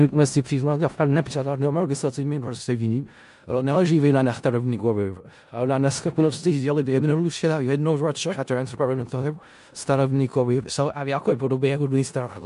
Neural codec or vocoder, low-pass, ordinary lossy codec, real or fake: codec, 16 kHz in and 24 kHz out, 0.4 kbps, LongCat-Audio-Codec, four codebook decoder; 10.8 kHz; MP3, 64 kbps; fake